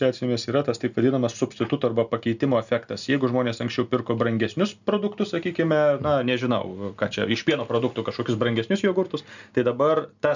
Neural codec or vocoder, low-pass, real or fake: none; 7.2 kHz; real